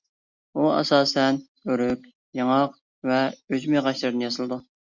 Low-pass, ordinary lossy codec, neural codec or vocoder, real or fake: 7.2 kHz; Opus, 64 kbps; none; real